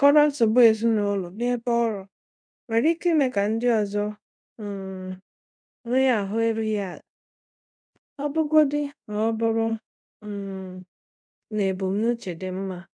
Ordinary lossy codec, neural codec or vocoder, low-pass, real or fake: none; codec, 24 kHz, 0.5 kbps, DualCodec; 9.9 kHz; fake